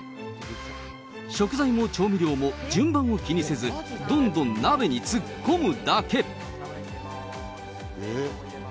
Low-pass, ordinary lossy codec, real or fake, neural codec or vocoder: none; none; real; none